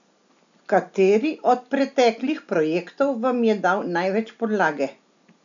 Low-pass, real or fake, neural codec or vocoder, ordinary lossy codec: 7.2 kHz; real; none; none